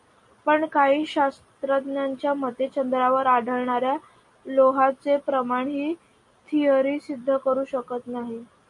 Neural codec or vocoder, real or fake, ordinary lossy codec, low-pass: none; real; MP3, 48 kbps; 10.8 kHz